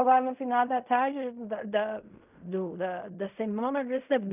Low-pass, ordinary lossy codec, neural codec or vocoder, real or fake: 3.6 kHz; none; codec, 16 kHz in and 24 kHz out, 0.4 kbps, LongCat-Audio-Codec, fine tuned four codebook decoder; fake